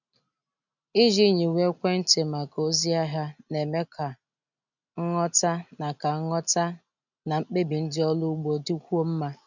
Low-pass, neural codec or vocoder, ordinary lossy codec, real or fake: 7.2 kHz; none; none; real